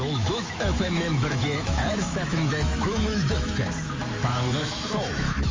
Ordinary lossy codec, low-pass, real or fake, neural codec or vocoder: Opus, 32 kbps; 7.2 kHz; fake; autoencoder, 48 kHz, 128 numbers a frame, DAC-VAE, trained on Japanese speech